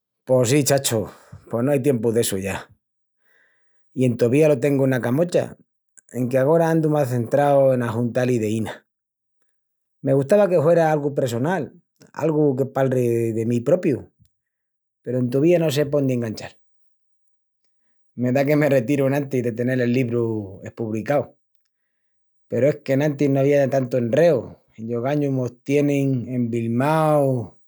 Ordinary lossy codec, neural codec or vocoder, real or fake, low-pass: none; none; real; none